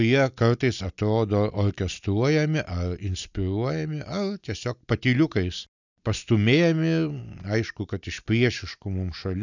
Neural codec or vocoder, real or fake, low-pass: none; real; 7.2 kHz